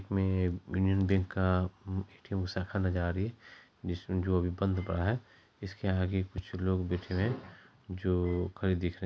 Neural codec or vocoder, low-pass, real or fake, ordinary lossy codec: none; none; real; none